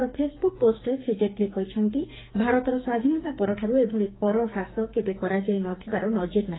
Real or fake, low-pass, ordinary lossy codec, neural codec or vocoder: fake; 7.2 kHz; AAC, 16 kbps; codec, 44.1 kHz, 2.6 kbps, SNAC